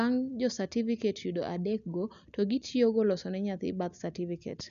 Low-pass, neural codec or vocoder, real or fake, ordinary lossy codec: 7.2 kHz; none; real; none